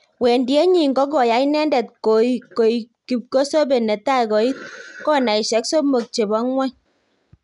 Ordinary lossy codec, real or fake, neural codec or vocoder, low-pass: none; real; none; 10.8 kHz